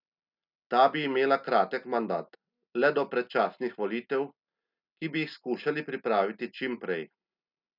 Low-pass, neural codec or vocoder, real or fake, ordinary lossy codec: 5.4 kHz; none; real; none